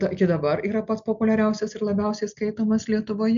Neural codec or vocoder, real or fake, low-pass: none; real; 7.2 kHz